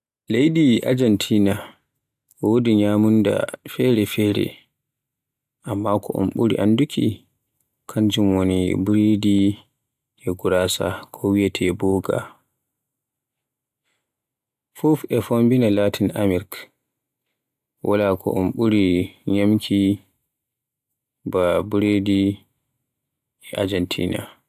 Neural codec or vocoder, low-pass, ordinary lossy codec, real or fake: none; 14.4 kHz; AAC, 96 kbps; real